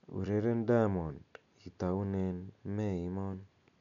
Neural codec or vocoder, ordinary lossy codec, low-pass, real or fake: none; none; 7.2 kHz; real